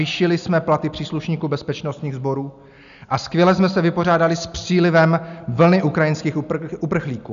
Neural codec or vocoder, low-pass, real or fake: none; 7.2 kHz; real